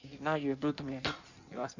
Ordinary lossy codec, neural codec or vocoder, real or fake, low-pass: none; codec, 16 kHz in and 24 kHz out, 1.1 kbps, FireRedTTS-2 codec; fake; 7.2 kHz